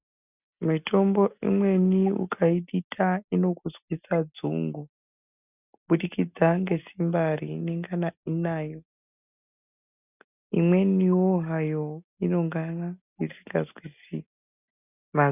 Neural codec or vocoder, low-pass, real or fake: none; 3.6 kHz; real